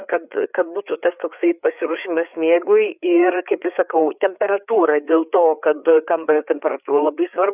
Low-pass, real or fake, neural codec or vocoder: 3.6 kHz; fake; codec, 16 kHz, 4 kbps, FreqCodec, larger model